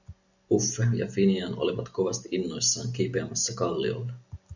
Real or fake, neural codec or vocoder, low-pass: real; none; 7.2 kHz